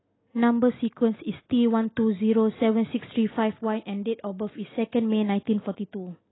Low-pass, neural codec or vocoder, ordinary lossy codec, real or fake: 7.2 kHz; none; AAC, 16 kbps; real